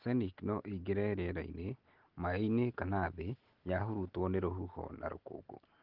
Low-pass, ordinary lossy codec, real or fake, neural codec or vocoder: 5.4 kHz; Opus, 16 kbps; real; none